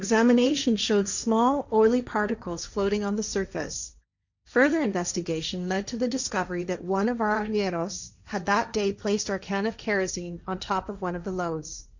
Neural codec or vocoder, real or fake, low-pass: codec, 16 kHz, 1.1 kbps, Voila-Tokenizer; fake; 7.2 kHz